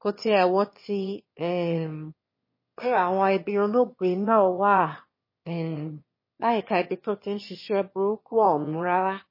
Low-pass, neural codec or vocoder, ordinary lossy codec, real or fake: 5.4 kHz; autoencoder, 22.05 kHz, a latent of 192 numbers a frame, VITS, trained on one speaker; MP3, 24 kbps; fake